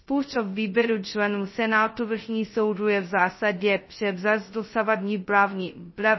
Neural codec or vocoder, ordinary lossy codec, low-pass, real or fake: codec, 16 kHz, 0.2 kbps, FocalCodec; MP3, 24 kbps; 7.2 kHz; fake